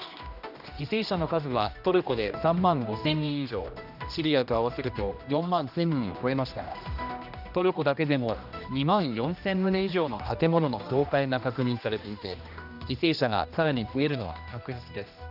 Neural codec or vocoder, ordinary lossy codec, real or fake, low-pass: codec, 16 kHz, 1 kbps, X-Codec, HuBERT features, trained on general audio; none; fake; 5.4 kHz